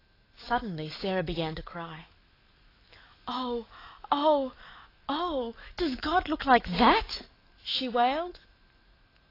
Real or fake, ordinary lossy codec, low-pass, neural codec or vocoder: fake; AAC, 24 kbps; 5.4 kHz; autoencoder, 48 kHz, 128 numbers a frame, DAC-VAE, trained on Japanese speech